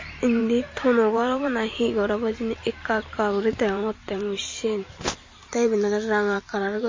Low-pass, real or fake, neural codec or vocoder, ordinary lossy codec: 7.2 kHz; fake; vocoder, 44.1 kHz, 128 mel bands every 512 samples, BigVGAN v2; MP3, 32 kbps